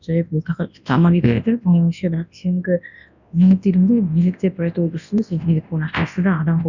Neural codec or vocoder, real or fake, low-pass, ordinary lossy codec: codec, 24 kHz, 0.9 kbps, WavTokenizer, large speech release; fake; 7.2 kHz; none